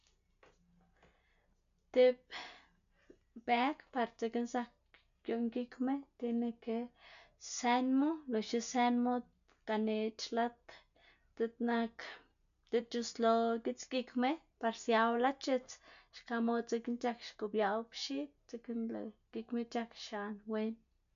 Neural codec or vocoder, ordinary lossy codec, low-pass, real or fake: none; none; 7.2 kHz; real